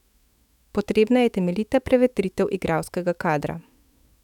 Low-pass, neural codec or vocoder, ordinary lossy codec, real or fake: 19.8 kHz; autoencoder, 48 kHz, 128 numbers a frame, DAC-VAE, trained on Japanese speech; none; fake